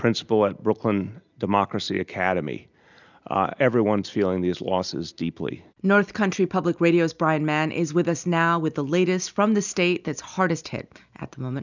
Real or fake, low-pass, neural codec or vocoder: real; 7.2 kHz; none